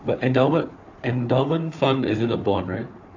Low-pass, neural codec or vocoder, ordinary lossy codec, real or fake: 7.2 kHz; codec, 16 kHz, 4 kbps, FunCodec, trained on Chinese and English, 50 frames a second; AAC, 48 kbps; fake